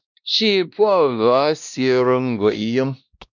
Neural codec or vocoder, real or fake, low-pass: codec, 16 kHz, 1 kbps, X-Codec, WavLM features, trained on Multilingual LibriSpeech; fake; 7.2 kHz